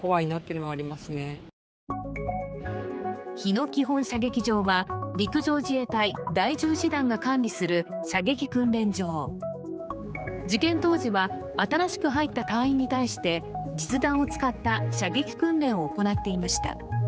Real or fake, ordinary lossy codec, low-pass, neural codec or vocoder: fake; none; none; codec, 16 kHz, 4 kbps, X-Codec, HuBERT features, trained on general audio